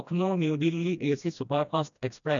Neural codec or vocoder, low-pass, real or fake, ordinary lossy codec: codec, 16 kHz, 1 kbps, FreqCodec, smaller model; 7.2 kHz; fake; none